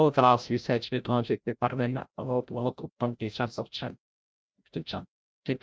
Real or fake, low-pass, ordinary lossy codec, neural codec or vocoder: fake; none; none; codec, 16 kHz, 0.5 kbps, FreqCodec, larger model